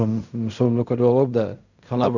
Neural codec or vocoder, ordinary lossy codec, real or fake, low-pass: codec, 16 kHz in and 24 kHz out, 0.4 kbps, LongCat-Audio-Codec, fine tuned four codebook decoder; none; fake; 7.2 kHz